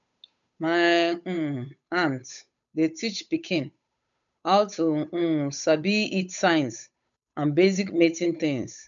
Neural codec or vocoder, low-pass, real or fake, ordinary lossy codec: codec, 16 kHz, 8 kbps, FunCodec, trained on Chinese and English, 25 frames a second; 7.2 kHz; fake; none